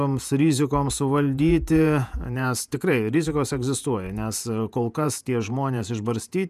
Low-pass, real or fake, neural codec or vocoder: 14.4 kHz; fake; vocoder, 48 kHz, 128 mel bands, Vocos